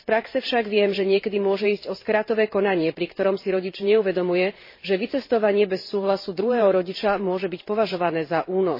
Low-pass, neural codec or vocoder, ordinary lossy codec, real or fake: 5.4 kHz; vocoder, 44.1 kHz, 128 mel bands every 512 samples, BigVGAN v2; MP3, 24 kbps; fake